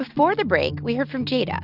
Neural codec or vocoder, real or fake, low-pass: none; real; 5.4 kHz